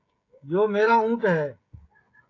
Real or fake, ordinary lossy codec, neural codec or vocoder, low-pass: fake; AAC, 32 kbps; codec, 16 kHz, 16 kbps, FreqCodec, smaller model; 7.2 kHz